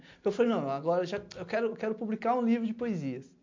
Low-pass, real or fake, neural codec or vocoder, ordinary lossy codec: 7.2 kHz; real; none; MP3, 48 kbps